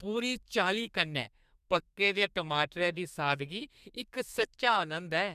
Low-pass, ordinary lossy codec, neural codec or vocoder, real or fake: 14.4 kHz; none; codec, 32 kHz, 1.9 kbps, SNAC; fake